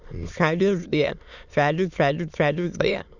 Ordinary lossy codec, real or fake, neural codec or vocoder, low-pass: none; fake; autoencoder, 22.05 kHz, a latent of 192 numbers a frame, VITS, trained on many speakers; 7.2 kHz